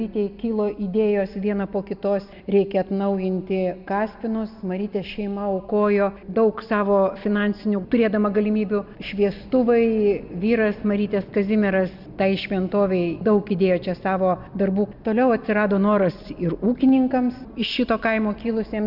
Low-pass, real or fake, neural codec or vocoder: 5.4 kHz; real; none